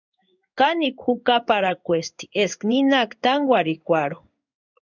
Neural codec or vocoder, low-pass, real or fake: codec, 16 kHz in and 24 kHz out, 1 kbps, XY-Tokenizer; 7.2 kHz; fake